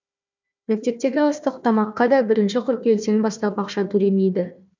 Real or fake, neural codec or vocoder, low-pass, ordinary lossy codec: fake; codec, 16 kHz, 1 kbps, FunCodec, trained on Chinese and English, 50 frames a second; 7.2 kHz; MP3, 64 kbps